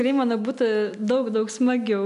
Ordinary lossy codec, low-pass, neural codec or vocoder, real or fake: AAC, 96 kbps; 10.8 kHz; none; real